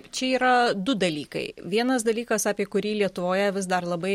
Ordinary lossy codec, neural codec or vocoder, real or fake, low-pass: MP3, 64 kbps; none; real; 19.8 kHz